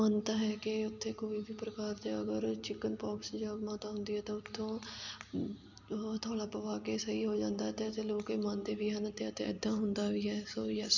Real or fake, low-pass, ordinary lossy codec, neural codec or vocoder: real; 7.2 kHz; none; none